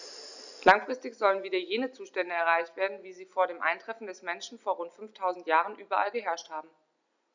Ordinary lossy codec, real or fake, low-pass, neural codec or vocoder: none; real; 7.2 kHz; none